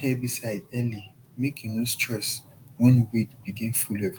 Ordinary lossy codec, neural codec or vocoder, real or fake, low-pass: Opus, 32 kbps; autoencoder, 48 kHz, 128 numbers a frame, DAC-VAE, trained on Japanese speech; fake; 19.8 kHz